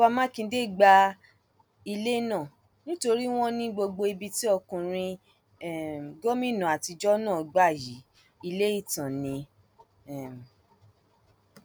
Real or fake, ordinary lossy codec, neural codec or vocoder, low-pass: real; none; none; none